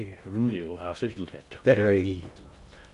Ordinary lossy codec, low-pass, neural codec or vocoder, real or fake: none; 10.8 kHz; codec, 16 kHz in and 24 kHz out, 0.6 kbps, FocalCodec, streaming, 2048 codes; fake